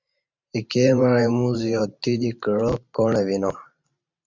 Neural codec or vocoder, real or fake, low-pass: vocoder, 44.1 kHz, 128 mel bands every 512 samples, BigVGAN v2; fake; 7.2 kHz